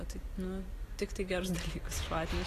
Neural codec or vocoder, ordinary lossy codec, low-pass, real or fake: none; AAC, 48 kbps; 14.4 kHz; real